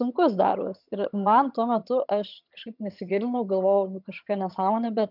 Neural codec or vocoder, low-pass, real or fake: vocoder, 22.05 kHz, 80 mel bands, HiFi-GAN; 5.4 kHz; fake